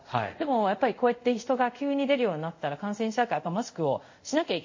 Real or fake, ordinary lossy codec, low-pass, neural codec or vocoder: fake; MP3, 32 kbps; 7.2 kHz; codec, 24 kHz, 0.5 kbps, DualCodec